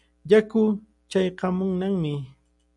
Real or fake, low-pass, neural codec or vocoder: real; 10.8 kHz; none